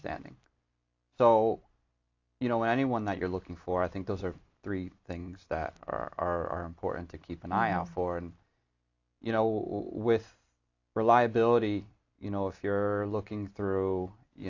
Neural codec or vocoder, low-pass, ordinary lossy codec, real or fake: none; 7.2 kHz; AAC, 48 kbps; real